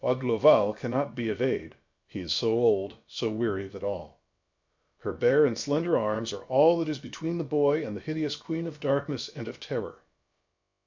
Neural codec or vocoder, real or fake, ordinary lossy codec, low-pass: codec, 16 kHz, about 1 kbps, DyCAST, with the encoder's durations; fake; MP3, 64 kbps; 7.2 kHz